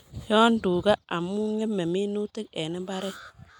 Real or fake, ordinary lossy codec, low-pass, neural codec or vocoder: real; none; 19.8 kHz; none